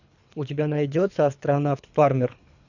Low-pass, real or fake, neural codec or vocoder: 7.2 kHz; fake; codec, 24 kHz, 3 kbps, HILCodec